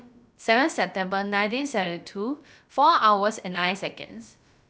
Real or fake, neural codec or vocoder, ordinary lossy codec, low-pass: fake; codec, 16 kHz, about 1 kbps, DyCAST, with the encoder's durations; none; none